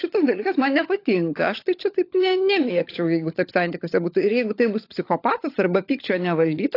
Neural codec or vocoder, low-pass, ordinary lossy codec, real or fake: codec, 16 kHz, 16 kbps, FunCodec, trained on LibriTTS, 50 frames a second; 5.4 kHz; AAC, 32 kbps; fake